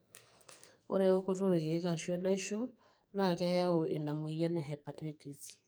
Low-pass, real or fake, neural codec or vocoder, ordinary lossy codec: none; fake; codec, 44.1 kHz, 2.6 kbps, SNAC; none